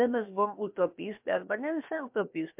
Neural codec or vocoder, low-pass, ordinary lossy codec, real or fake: codec, 16 kHz, about 1 kbps, DyCAST, with the encoder's durations; 3.6 kHz; MP3, 32 kbps; fake